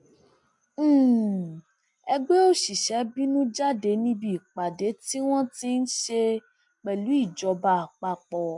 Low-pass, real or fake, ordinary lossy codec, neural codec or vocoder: 10.8 kHz; real; MP3, 64 kbps; none